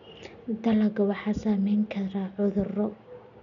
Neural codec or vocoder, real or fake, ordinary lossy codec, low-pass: none; real; none; 7.2 kHz